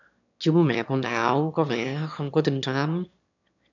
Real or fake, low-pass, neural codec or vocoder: fake; 7.2 kHz; autoencoder, 22.05 kHz, a latent of 192 numbers a frame, VITS, trained on one speaker